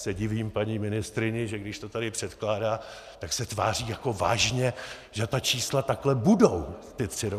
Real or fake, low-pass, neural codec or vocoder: real; 14.4 kHz; none